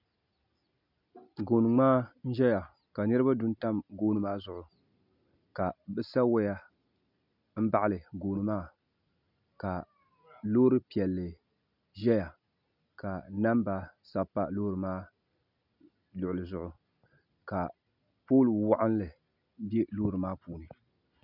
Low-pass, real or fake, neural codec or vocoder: 5.4 kHz; real; none